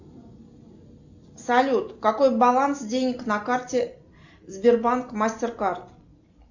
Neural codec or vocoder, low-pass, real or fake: none; 7.2 kHz; real